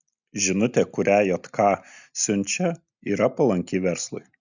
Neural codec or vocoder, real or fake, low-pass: none; real; 7.2 kHz